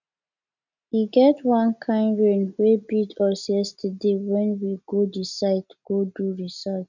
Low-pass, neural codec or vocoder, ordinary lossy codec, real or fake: 7.2 kHz; none; none; real